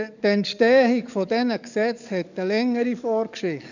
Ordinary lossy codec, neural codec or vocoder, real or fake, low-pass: none; codec, 44.1 kHz, 7.8 kbps, DAC; fake; 7.2 kHz